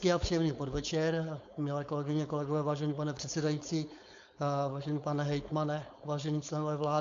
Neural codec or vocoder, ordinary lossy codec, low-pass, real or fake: codec, 16 kHz, 4.8 kbps, FACodec; AAC, 64 kbps; 7.2 kHz; fake